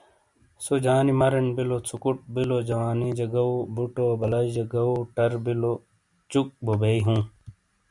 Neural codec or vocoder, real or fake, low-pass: none; real; 10.8 kHz